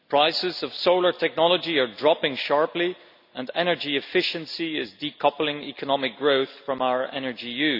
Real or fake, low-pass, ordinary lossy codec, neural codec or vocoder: real; 5.4 kHz; none; none